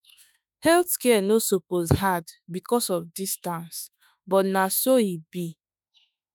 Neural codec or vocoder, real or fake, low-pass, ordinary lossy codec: autoencoder, 48 kHz, 32 numbers a frame, DAC-VAE, trained on Japanese speech; fake; none; none